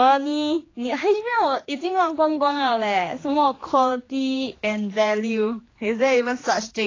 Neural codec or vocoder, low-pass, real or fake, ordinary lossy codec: codec, 16 kHz, 2 kbps, X-Codec, HuBERT features, trained on general audio; 7.2 kHz; fake; AAC, 32 kbps